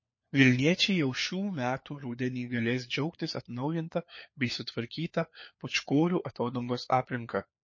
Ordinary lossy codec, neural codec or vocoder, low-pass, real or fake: MP3, 32 kbps; codec, 16 kHz, 4 kbps, FunCodec, trained on LibriTTS, 50 frames a second; 7.2 kHz; fake